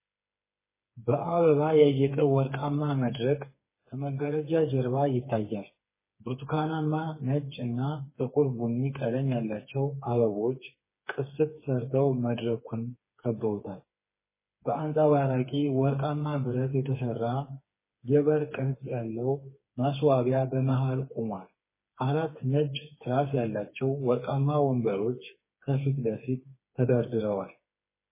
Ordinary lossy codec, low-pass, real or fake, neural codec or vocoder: MP3, 16 kbps; 3.6 kHz; fake; codec, 16 kHz, 4 kbps, FreqCodec, smaller model